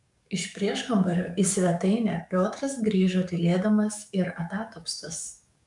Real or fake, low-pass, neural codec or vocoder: fake; 10.8 kHz; codec, 44.1 kHz, 7.8 kbps, DAC